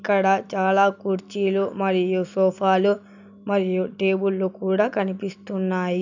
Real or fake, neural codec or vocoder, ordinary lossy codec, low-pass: real; none; none; 7.2 kHz